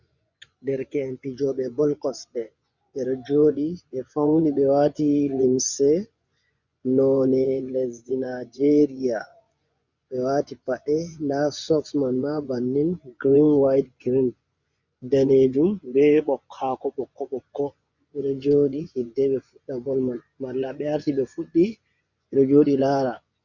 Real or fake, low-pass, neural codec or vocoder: fake; 7.2 kHz; vocoder, 24 kHz, 100 mel bands, Vocos